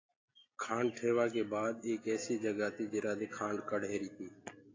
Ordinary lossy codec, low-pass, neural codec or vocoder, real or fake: AAC, 32 kbps; 7.2 kHz; none; real